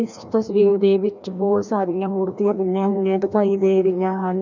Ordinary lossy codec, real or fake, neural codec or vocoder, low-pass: none; fake; codec, 16 kHz, 1 kbps, FreqCodec, larger model; 7.2 kHz